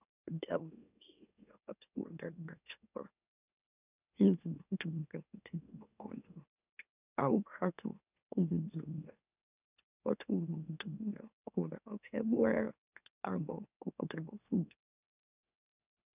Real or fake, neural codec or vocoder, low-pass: fake; autoencoder, 44.1 kHz, a latent of 192 numbers a frame, MeloTTS; 3.6 kHz